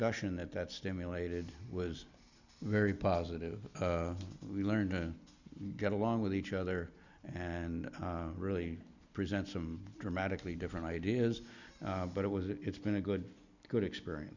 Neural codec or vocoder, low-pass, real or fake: none; 7.2 kHz; real